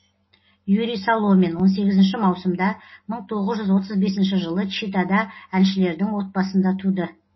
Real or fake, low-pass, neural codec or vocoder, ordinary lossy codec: real; 7.2 kHz; none; MP3, 24 kbps